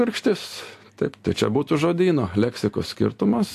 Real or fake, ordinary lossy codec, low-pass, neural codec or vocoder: real; AAC, 64 kbps; 14.4 kHz; none